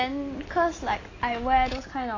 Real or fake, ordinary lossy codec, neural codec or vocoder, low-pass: real; AAC, 32 kbps; none; 7.2 kHz